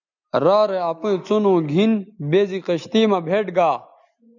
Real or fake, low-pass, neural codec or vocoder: real; 7.2 kHz; none